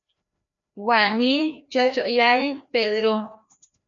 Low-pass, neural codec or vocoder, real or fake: 7.2 kHz; codec, 16 kHz, 1 kbps, FreqCodec, larger model; fake